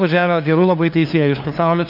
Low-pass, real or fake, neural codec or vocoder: 5.4 kHz; fake; codec, 16 kHz, 2 kbps, FunCodec, trained on LibriTTS, 25 frames a second